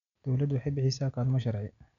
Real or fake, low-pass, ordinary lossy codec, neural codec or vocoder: real; 7.2 kHz; none; none